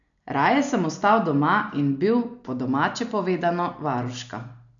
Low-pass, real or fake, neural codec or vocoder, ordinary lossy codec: 7.2 kHz; real; none; none